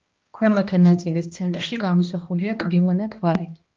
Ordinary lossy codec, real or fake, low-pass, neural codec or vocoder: Opus, 24 kbps; fake; 7.2 kHz; codec, 16 kHz, 1 kbps, X-Codec, HuBERT features, trained on balanced general audio